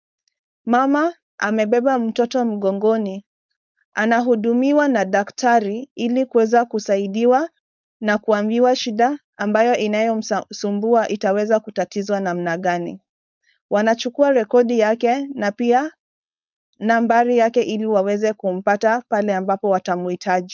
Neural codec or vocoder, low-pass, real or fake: codec, 16 kHz, 4.8 kbps, FACodec; 7.2 kHz; fake